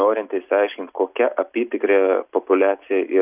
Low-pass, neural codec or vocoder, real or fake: 3.6 kHz; none; real